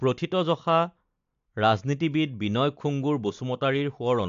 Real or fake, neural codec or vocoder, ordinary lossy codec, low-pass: real; none; AAC, 64 kbps; 7.2 kHz